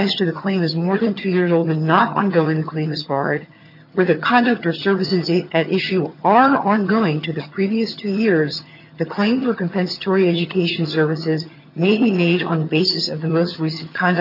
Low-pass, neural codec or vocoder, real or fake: 5.4 kHz; vocoder, 22.05 kHz, 80 mel bands, HiFi-GAN; fake